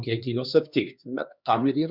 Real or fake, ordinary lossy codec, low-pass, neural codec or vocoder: fake; Opus, 64 kbps; 5.4 kHz; codec, 16 kHz, 2 kbps, X-Codec, HuBERT features, trained on LibriSpeech